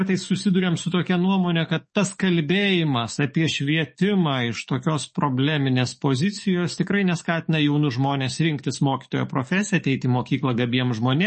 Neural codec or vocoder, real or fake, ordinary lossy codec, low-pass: codec, 44.1 kHz, 7.8 kbps, DAC; fake; MP3, 32 kbps; 10.8 kHz